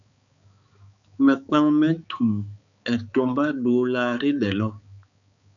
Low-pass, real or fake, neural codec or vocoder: 7.2 kHz; fake; codec, 16 kHz, 4 kbps, X-Codec, HuBERT features, trained on balanced general audio